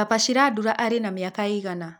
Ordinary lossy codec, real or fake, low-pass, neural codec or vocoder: none; real; none; none